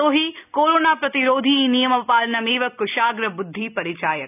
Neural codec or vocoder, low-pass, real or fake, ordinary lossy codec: none; 3.6 kHz; real; none